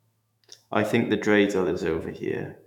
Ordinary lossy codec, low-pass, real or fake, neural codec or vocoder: none; 19.8 kHz; fake; autoencoder, 48 kHz, 128 numbers a frame, DAC-VAE, trained on Japanese speech